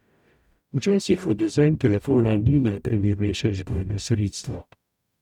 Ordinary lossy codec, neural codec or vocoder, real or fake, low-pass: none; codec, 44.1 kHz, 0.9 kbps, DAC; fake; 19.8 kHz